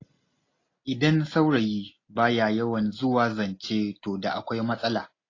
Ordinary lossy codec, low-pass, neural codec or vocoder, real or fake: AAC, 32 kbps; 7.2 kHz; none; real